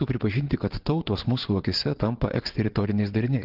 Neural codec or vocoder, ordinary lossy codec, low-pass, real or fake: vocoder, 22.05 kHz, 80 mel bands, WaveNeXt; Opus, 16 kbps; 5.4 kHz; fake